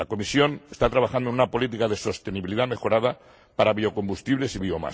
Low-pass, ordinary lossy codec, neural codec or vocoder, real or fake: none; none; none; real